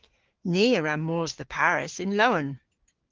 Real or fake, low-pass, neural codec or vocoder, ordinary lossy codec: fake; 7.2 kHz; codec, 16 kHz, 6 kbps, DAC; Opus, 16 kbps